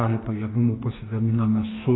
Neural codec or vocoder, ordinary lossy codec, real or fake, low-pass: codec, 44.1 kHz, 2.6 kbps, DAC; AAC, 16 kbps; fake; 7.2 kHz